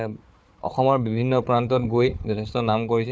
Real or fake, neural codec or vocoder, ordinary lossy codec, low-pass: fake; codec, 16 kHz, 4 kbps, FunCodec, trained on Chinese and English, 50 frames a second; none; none